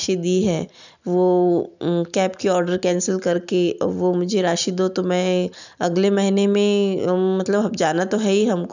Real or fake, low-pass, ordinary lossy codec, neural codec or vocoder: real; 7.2 kHz; none; none